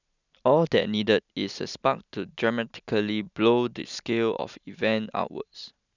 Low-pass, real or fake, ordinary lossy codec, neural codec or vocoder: 7.2 kHz; real; none; none